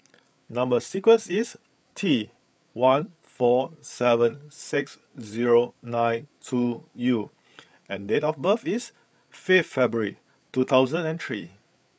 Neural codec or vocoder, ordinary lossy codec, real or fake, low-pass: codec, 16 kHz, 8 kbps, FreqCodec, larger model; none; fake; none